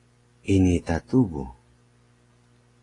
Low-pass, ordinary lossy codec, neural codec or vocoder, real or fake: 10.8 kHz; AAC, 32 kbps; none; real